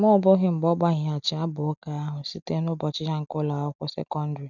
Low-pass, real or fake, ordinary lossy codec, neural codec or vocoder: 7.2 kHz; real; none; none